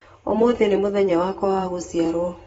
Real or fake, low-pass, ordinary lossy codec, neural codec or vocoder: fake; 19.8 kHz; AAC, 24 kbps; vocoder, 44.1 kHz, 128 mel bands every 512 samples, BigVGAN v2